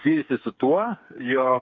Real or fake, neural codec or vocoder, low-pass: fake; codec, 16 kHz, 4 kbps, FreqCodec, smaller model; 7.2 kHz